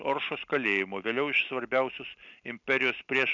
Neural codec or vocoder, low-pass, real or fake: none; 7.2 kHz; real